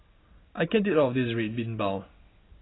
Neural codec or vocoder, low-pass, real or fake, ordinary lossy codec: none; 7.2 kHz; real; AAC, 16 kbps